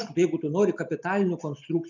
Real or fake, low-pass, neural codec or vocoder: real; 7.2 kHz; none